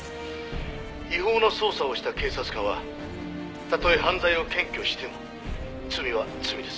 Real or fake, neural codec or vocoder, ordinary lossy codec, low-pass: real; none; none; none